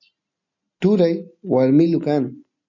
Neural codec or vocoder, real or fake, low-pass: none; real; 7.2 kHz